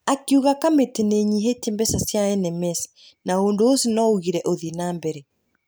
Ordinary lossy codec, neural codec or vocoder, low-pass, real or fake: none; none; none; real